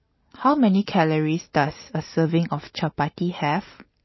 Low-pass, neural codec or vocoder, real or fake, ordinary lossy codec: 7.2 kHz; none; real; MP3, 24 kbps